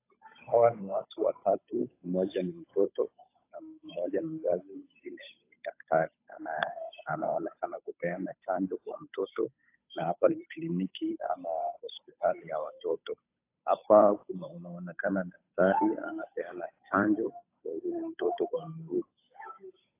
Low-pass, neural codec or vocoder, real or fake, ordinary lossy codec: 3.6 kHz; codec, 16 kHz, 8 kbps, FunCodec, trained on Chinese and English, 25 frames a second; fake; AAC, 24 kbps